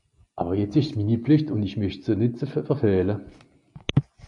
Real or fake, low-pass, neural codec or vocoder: real; 10.8 kHz; none